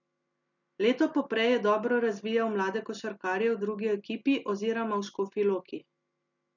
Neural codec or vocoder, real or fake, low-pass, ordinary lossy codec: none; real; 7.2 kHz; none